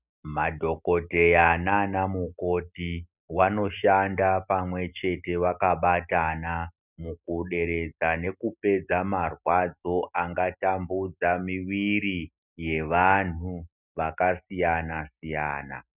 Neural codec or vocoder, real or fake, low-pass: none; real; 3.6 kHz